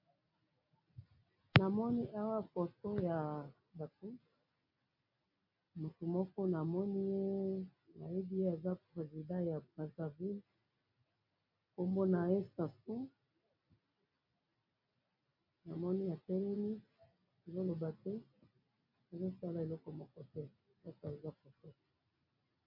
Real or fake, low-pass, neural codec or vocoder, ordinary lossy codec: real; 5.4 kHz; none; MP3, 48 kbps